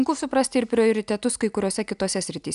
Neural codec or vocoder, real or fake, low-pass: none; real; 10.8 kHz